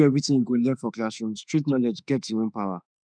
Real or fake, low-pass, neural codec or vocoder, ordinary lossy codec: fake; 9.9 kHz; autoencoder, 48 kHz, 32 numbers a frame, DAC-VAE, trained on Japanese speech; none